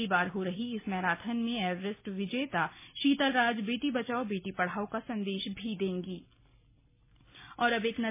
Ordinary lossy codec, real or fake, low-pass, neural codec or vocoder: MP3, 16 kbps; real; 3.6 kHz; none